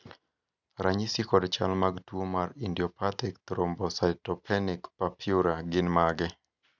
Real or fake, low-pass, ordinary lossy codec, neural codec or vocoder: real; 7.2 kHz; none; none